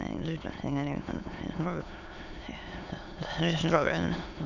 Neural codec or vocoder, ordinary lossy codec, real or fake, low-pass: autoencoder, 22.05 kHz, a latent of 192 numbers a frame, VITS, trained on many speakers; none; fake; 7.2 kHz